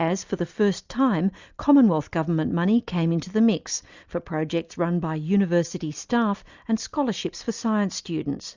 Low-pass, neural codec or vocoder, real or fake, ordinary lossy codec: 7.2 kHz; none; real; Opus, 64 kbps